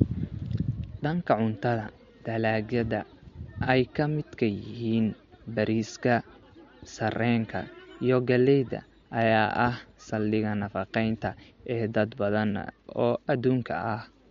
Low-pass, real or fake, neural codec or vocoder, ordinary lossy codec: 7.2 kHz; real; none; MP3, 48 kbps